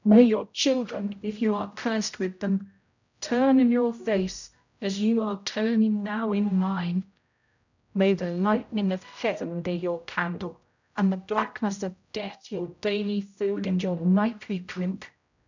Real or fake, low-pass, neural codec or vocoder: fake; 7.2 kHz; codec, 16 kHz, 0.5 kbps, X-Codec, HuBERT features, trained on general audio